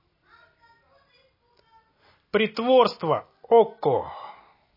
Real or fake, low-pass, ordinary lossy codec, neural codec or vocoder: real; 5.4 kHz; MP3, 24 kbps; none